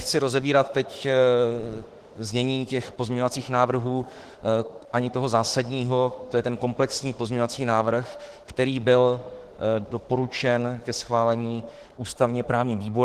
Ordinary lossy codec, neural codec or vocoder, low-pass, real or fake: Opus, 16 kbps; autoencoder, 48 kHz, 32 numbers a frame, DAC-VAE, trained on Japanese speech; 14.4 kHz; fake